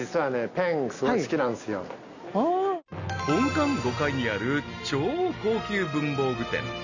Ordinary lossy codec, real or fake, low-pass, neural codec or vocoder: none; real; 7.2 kHz; none